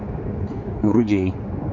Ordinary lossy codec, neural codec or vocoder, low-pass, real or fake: MP3, 48 kbps; codec, 16 kHz, 4 kbps, X-Codec, HuBERT features, trained on general audio; 7.2 kHz; fake